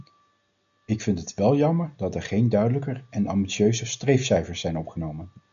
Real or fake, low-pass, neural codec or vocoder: real; 7.2 kHz; none